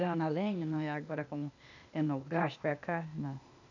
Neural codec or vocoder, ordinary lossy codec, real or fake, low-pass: codec, 16 kHz, 0.8 kbps, ZipCodec; none; fake; 7.2 kHz